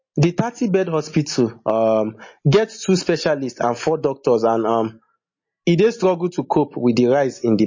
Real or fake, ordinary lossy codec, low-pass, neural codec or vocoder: real; MP3, 32 kbps; 7.2 kHz; none